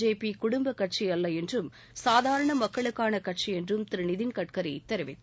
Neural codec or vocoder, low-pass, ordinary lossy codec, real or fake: none; none; none; real